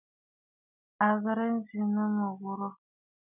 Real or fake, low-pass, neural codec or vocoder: real; 3.6 kHz; none